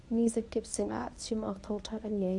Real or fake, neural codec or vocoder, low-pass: fake; codec, 24 kHz, 0.9 kbps, WavTokenizer, medium speech release version 1; 10.8 kHz